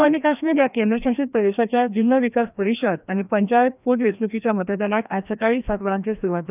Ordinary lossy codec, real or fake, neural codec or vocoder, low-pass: none; fake; codec, 16 kHz, 1 kbps, FreqCodec, larger model; 3.6 kHz